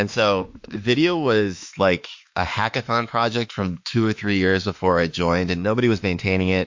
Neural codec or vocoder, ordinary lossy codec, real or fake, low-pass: autoencoder, 48 kHz, 32 numbers a frame, DAC-VAE, trained on Japanese speech; MP3, 48 kbps; fake; 7.2 kHz